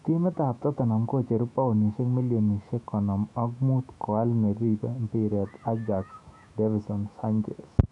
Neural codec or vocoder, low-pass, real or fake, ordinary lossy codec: autoencoder, 48 kHz, 128 numbers a frame, DAC-VAE, trained on Japanese speech; 10.8 kHz; fake; none